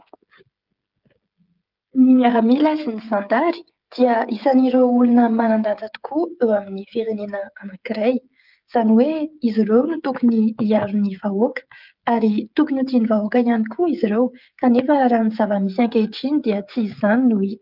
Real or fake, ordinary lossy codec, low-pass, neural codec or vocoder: fake; Opus, 24 kbps; 5.4 kHz; codec, 16 kHz, 16 kbps, FreqCodec, smaller model